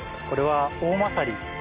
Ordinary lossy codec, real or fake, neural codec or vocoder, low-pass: Opus, 32 kbps; real; none; 3.6 kHz